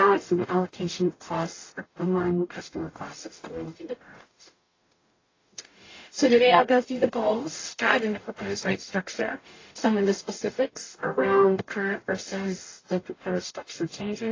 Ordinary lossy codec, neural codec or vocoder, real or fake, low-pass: AAC, 32 kbps; codec, 44.1 kHz, 0.9 kbps, DAC; fake; 7.2 kHz